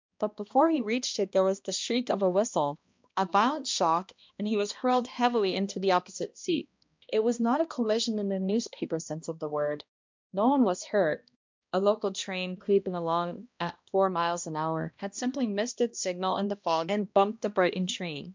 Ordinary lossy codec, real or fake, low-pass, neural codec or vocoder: MP3, 64 kbps; fake; 7.2 kHz; codec, 16 kHz, 1 kbps, X-Codec, HuBERT features, trained on balanced general audio